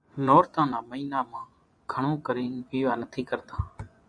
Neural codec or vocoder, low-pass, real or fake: vocoder, 24 kHz, 100 mel bands, Vocos; 9.9 kHz; fake